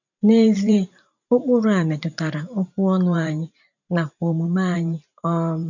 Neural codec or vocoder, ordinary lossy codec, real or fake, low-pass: vocoder, 44.1 kHz, 128 mel bands every 512 samples, BigVGAN v2; none; fake; 7.2 kHz